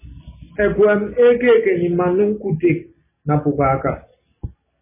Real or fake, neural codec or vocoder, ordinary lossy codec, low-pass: real; none; MP3, 16 kbps; 3.6 kHz